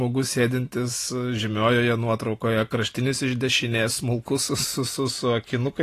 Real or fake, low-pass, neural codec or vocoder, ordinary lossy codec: real; 14.4 kHz; none; AAC, 48 kbps